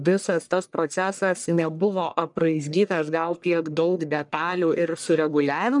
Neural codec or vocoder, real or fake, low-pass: codec, 44.1 kHz, 1.7 kbps, Pupu-Codec; fake; 10.8 kHz